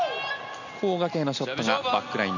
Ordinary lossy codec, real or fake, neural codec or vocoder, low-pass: none; real; none; 7.2 kHz